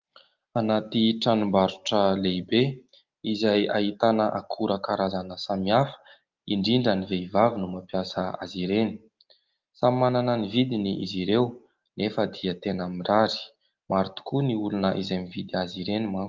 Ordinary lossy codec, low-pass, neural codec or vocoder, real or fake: Opus, 24 kbps; 7.2 kHz; none; real